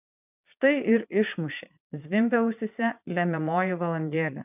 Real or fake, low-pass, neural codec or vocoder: fake; 3.6 kHz; vocoder, 22.05 kHz, 80 mel bands, Vocos